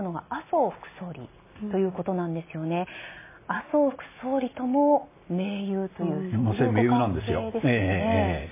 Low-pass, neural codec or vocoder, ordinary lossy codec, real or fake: 3.6 kHz; none; MP3, 16 kbps; real